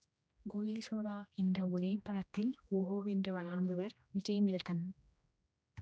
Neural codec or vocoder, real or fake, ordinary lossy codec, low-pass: codec, 16 kHz, 1 kbps, X-Codec, HuBERT features, trained on general audio; fake; none; none